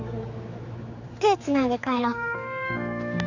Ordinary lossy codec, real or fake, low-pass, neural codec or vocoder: none; fake; 7.2 kHz; codec, 16 kHz, 4 kbps, X-Codec, HuBERT features, trained on general audio